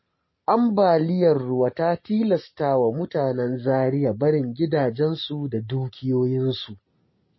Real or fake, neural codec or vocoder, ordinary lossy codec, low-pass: real; none; MP3, 24 kbps; 7.2 kHz